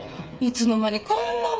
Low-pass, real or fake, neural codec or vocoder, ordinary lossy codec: none; fake; codec, 16 kHz, 8 kbps, FreqCodec, smaller model; none